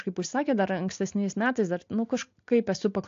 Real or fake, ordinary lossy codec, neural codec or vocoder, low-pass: real; AAC, 48 kbps; none; 7.2 kHz